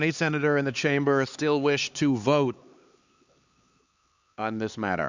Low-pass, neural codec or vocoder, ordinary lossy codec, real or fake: 7.2 kHz; codec, 16 kHz, 4 kbps, X-Codec, HuBERT features, trained on LibriSpeech; Opus, 64 kbps; fake